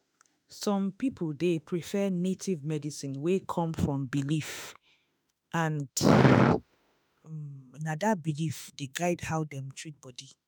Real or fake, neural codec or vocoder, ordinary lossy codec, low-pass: fake; autoencoder, 48 kHz, 32 numbers a frame, DAC-VAE, trained on Japanese speech; none; none